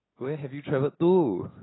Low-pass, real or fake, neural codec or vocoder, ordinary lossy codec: 7.2 kHz; real; none; AAC, 16 kbps